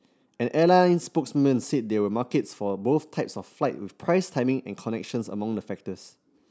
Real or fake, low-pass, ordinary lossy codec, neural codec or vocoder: real; none; none; none